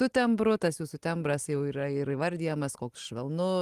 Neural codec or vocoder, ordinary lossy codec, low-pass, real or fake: none; Opus, 24 kbps; 14.4 kHz; real